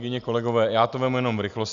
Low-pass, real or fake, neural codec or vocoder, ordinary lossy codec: 7.2 kHz; real; none; MP3, 96 kbps